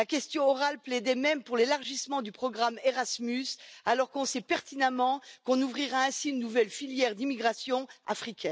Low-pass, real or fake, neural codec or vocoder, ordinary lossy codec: none; real; none; none